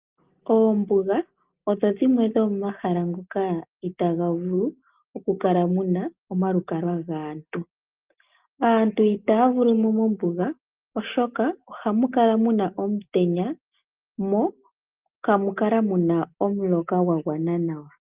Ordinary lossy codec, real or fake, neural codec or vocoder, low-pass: Opus, 32 kbps; real; none; 3.6 kHz